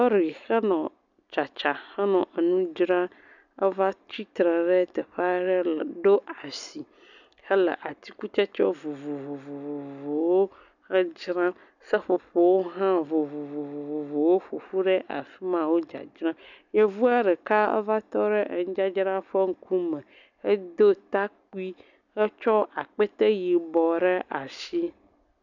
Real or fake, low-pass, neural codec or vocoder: real; 7.2 kHz; none